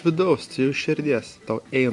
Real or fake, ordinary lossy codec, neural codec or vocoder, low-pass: real; MP3, 64 kbps; none; 10.8 kHz